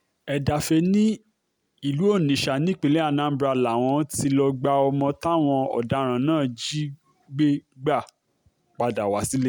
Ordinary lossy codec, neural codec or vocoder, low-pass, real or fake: none; none; none; real